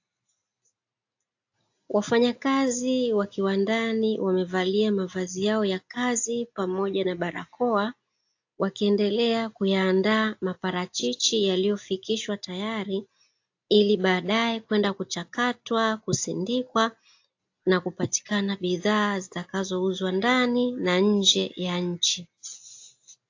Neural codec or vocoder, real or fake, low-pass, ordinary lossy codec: none; real; 7.2 kHz; AAC, 48 kbps